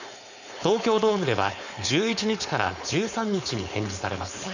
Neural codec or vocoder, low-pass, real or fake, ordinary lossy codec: codec, 16 kHz, 4.8 kbps, FACodec; 7.2 kHz; fake; none